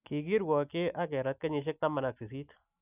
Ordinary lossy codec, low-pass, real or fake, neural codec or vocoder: none; 3.6 kHz; real; none